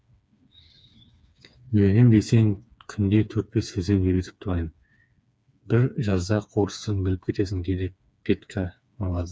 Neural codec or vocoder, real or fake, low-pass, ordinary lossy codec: codec, 16 kHz, 4 kbps, FreqCodec, smaller model; fake; none; none